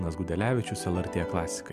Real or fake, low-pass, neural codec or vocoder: real; 14.4 kHz; none